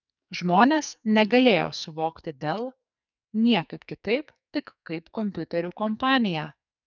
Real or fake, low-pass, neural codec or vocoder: fake; 7.2 kHz; codec, 44.1 kHz, 2.6 kbps, SNAC